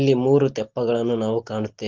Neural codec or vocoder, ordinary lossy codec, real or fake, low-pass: none; Opus, 16 kbps; real; 7.2 kHz